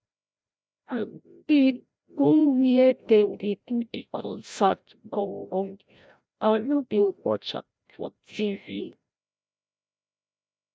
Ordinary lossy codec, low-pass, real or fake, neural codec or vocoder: none; none; fake; codec, 16 kHz, 0.5 kbps, FreqCodec, larger model